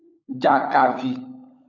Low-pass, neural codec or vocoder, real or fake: 7.2 kHz; codec, 16 kHz, 16 kbps, FunCodec, trained on LibriTTS, 50 frames a second; fake